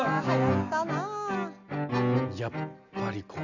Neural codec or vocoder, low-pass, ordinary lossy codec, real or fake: none; 7.2 kHz; none; real